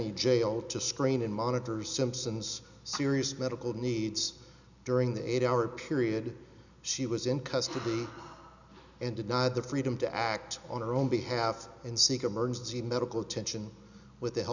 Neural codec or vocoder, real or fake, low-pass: none; real; 7.2 kHz